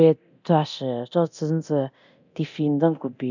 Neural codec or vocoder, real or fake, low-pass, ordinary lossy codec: codec, 24 kHz, 0.9 kbps, DualCodec; fake; 7.2 kHz; none